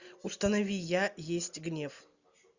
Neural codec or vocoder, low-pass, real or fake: none; 7.2 kHz; real